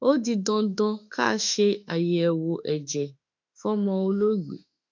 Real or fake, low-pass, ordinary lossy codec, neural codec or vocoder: fake; 7.2 kHz; MP3, 64 kbps; autoencoder, 48 kHz, 32 numbers a frame, DAC-VAE, trained on Japanese speech